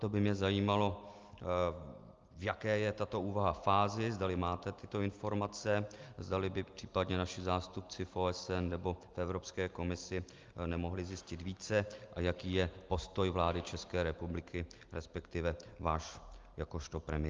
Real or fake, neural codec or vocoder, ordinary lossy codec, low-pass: real; none; Opus, 32 kbps; 7.2 kHz